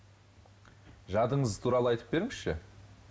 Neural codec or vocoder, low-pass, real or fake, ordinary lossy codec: none; none; real; none